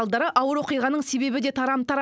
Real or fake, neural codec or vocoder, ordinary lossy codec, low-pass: real; none; none; none